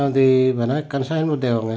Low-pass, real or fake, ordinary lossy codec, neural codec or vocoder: none; real; none; none